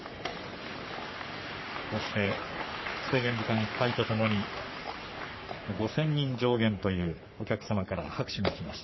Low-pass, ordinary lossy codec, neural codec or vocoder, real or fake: 7.2 kHz; MP3, 24 kbps; codec, 44.1 kHz, 3.4 kbps, Pupu-Codec; fake